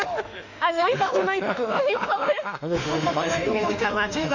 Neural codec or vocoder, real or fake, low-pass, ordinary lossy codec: autoencoder, 48 kHz, 32 numbers a frame, DAC-VAE, trained on Japanese speech; fake; 7.2 kHz; none